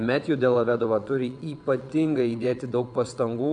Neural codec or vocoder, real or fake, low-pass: vocoder, 22.05 kHz, 80 mel bands, WaveNeXt; fake; 9.9 kHz